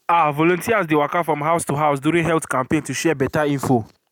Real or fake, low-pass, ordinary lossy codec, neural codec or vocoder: real; 19.8 kHz; none; none